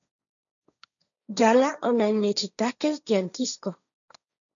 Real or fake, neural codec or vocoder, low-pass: fake; codec, 16 kHz, 1.1 kbps, Voila-Tokenizer; 7.2 kHz